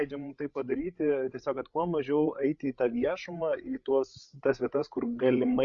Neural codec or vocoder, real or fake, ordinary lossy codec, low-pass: codec, 16 kHz, 8 kbps, FreqCodec, larger model; fake; Opus, 64 kbps; 7.2 kHz